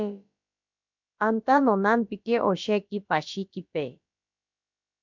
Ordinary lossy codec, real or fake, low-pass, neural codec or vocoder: MP3, 64 kbps; fake; 7.2 kHz; codec, 16 kHz, about 1 kbps, DyCAST, with the encoder's durations